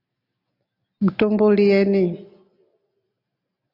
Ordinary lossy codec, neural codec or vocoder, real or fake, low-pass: AAC, 32 kbps; none; real; 5.4 kHz